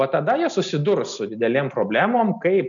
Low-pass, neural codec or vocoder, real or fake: 7.2 kHz; none; real